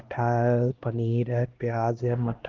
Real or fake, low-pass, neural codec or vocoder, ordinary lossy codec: fake; 7.2 kHz; codec, 16 kHz, 1 kbps, X-Codec, HuBERT features, trained on LibriSpeech; Opus, 16 kbps